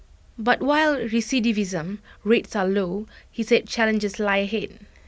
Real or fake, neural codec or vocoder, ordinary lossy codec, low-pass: real; none; none; none